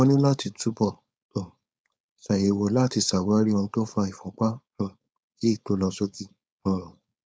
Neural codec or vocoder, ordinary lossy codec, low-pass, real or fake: codec, 16 kHz, 4.8 kbps, FACodec; none; none; fake